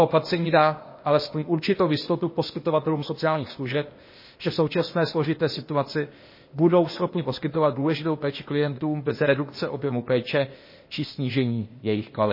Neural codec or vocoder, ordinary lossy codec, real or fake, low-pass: codec, 16 kHz, 0.8 kbps, ZipCodec; MP3, 24 kbps; fake; 5.4 kHz